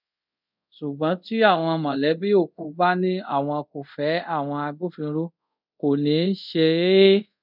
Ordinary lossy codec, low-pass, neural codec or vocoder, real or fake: none; 5.4 kHz; codec, 24 kHz, 0.5 kbps, DualCodec; fake